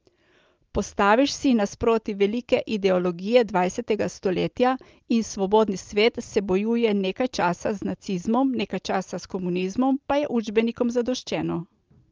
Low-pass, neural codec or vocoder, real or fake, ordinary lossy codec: 7.2 kHz; none; real; Opus, 24 kbps